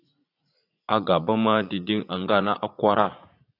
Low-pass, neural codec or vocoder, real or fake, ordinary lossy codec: 5.4 kHz; vocoder, 44.1 kHz, 80 mel bands, Vocos; fake; AAC, 32 kbps